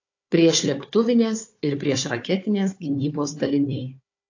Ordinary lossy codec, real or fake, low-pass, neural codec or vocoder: AAC, 48 kbps; fake; 7.2 kHz; codec, 16 kHz, 4 kbps, FunCodec, trained on Chinese and English, 50 frames a second